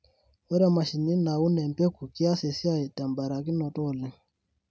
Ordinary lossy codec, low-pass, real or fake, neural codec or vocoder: none; none; real; none